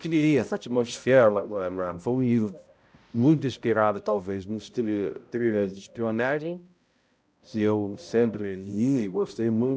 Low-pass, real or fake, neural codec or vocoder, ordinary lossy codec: none; fake; codec, 16 kHz, 0.5 kbps, X-Codec, HuBERT features, trained on balanced general audio; none